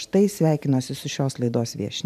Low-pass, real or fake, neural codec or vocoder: 14.4 kHz; fake; vocoder, 44.1 kHz, 128 mel bands every 512 samples, BigVGAN v2